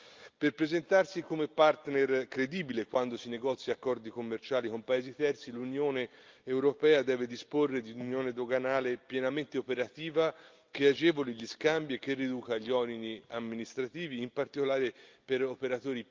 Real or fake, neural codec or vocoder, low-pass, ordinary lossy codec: real; none; 7.2 kHz; Opus, 24 kbps